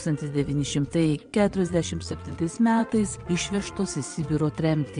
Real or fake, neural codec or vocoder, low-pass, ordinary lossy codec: fake; vocoder, 22.05 kHz, 80 mel bands, Vocos; 9.9 kHz; MP3, 64 kbps